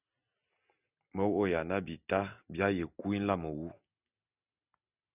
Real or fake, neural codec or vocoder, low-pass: real; none; 3.6 kHz